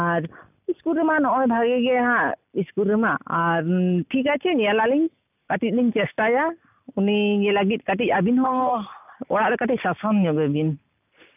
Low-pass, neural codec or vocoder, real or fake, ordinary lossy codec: 3.6 kHz; none; real; none